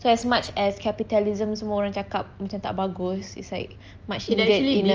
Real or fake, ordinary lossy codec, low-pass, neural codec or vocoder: real; Opus, 24 kbps; 7.2 kHz; none